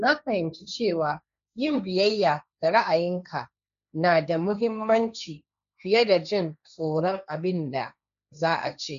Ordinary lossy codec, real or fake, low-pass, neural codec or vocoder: none; fake; 7.2 kHz; codec, 16 kHz, 1.1 kbps, Voila-Tokenizer